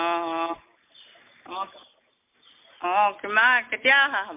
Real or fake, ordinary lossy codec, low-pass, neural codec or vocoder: real; MP3, 32 kbps; 3.6 kHz; none